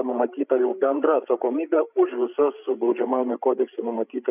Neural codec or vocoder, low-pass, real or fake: codec, 16 kHz, 8 kbps, FreqCodec, larger model; 3.6 kHz; fake